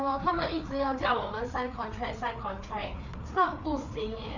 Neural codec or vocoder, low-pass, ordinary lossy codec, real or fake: codec, 16 kHz, 4 kbps, FreqCodec, larger model; 7.2 kHz; none; fake